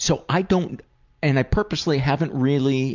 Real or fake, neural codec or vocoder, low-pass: real; none; 7.2 kHz